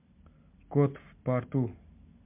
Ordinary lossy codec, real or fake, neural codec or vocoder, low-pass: MP3, 32 kbps; real; none; 3.6 kHz